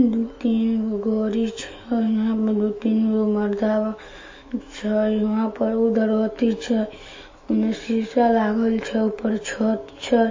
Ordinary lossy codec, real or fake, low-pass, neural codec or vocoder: MP3, 32 kbps; fake; 7.2 kHz; autoencoder, 48 kHz, 128 numbers a frame, DAC-VAE, trained on Japanese speech